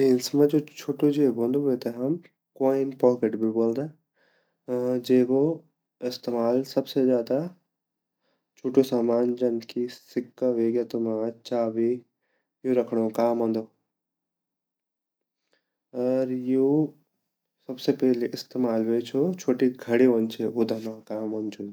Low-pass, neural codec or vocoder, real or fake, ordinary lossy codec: none; none; real; none